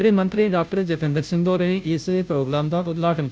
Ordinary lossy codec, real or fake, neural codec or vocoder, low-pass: none; fake; codec, 16 kHz, 0.5 kbps, FunCodec, trained on Chinese and English, 25 frames a second; none